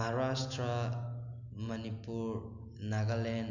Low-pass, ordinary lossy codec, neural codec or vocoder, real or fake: 7.2 kHz; none; none; real